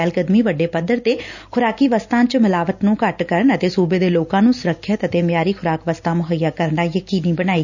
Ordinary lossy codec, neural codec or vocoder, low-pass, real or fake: none; none; 7.2 kHz; real